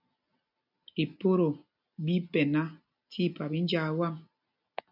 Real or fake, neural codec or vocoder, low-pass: real; none; 5.4 kHz